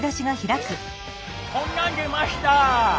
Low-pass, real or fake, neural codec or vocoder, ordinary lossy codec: none; real; none; none